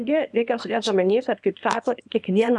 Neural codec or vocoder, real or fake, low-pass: codec, 24 kHz, 0.9 kbps, WavTokenizer, small release; fake; 10.8 kHz